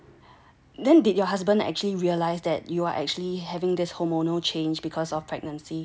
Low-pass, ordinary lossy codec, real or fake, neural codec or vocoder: none; none; real; none